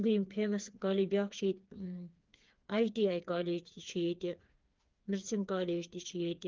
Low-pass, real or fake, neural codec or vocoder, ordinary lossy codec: 7.2 kHz; fake; codec, 16 kHz, 4 kbps, FreqCodec, smaller model; Opus, 32 kbps